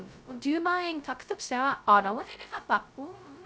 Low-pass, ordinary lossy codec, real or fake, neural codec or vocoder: none; none; fake; codec, 16 kHz, 0.2 kbps, FocalCodec